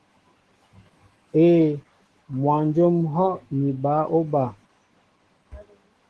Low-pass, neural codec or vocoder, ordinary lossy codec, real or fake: 10.8 kHz; autoencoder, 48 kHz, 128 numbers a frame, DAC-VAE, trained on Japanese speech; Opus, 16 kbps; fake